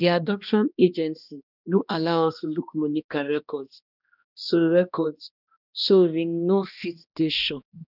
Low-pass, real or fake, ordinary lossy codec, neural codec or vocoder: 5.4 kHz; fake; none; codec, 16 kHz, 1 kbps, X-Codec, HuBERT features, trained on balanced general audio